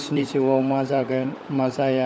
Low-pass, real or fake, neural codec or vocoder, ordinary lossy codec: none; fake; codec, 16 kHz, 16 kbps, FunCodec, trained on LibriTTS, 50 frames a second; none